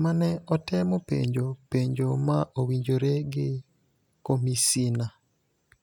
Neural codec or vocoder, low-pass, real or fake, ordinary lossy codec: none; 19.8 kHz; real; none